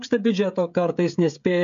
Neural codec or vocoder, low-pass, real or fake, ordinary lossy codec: codec, 16 kHz, 16 kbps, FreqCodec, smaller model; 7.2 kHz; fake; MP3, 64 kbps